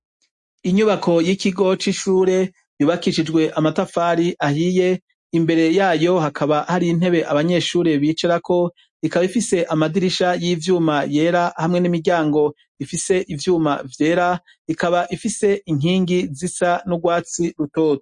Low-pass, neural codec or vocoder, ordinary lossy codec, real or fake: 10.8 kHz; none; MP3, 48 kbps; real